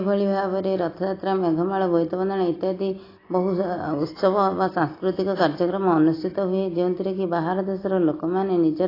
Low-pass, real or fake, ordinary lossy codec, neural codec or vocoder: 5.4 kHz; real; AAC, 24 kbps; none